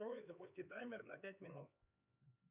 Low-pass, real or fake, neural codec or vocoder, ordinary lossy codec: 3.6 kHz; fake; codec, 16 kHz, 4 kbps, X-Codec, HuBERT features, trained on LibriSpeech; Opus, 64 kbps